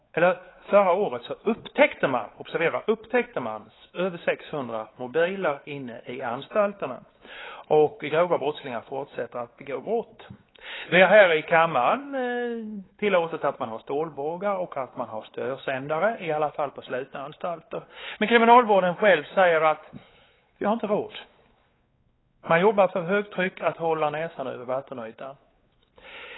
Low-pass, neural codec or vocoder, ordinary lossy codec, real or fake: 7.2 kHz; codec, 16 kHz, 4 kbps, X-Codec, WavLM features, trained on Multilingual LibriSpeech; AAC, 16 kbps; fake